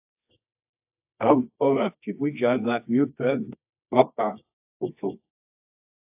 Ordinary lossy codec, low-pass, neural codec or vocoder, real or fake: AAC, 32 kbps; 3.6 kHz; codec, 24 kHz, 0.9 kbps, WavTokenizer, medium music audio release; fake